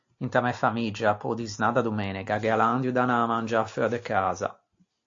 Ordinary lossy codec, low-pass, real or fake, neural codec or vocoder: AAC, 48 kbps; 7.2 kHz; real; none